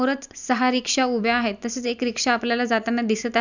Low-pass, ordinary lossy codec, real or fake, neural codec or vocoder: 7.2 kHz; none; real; none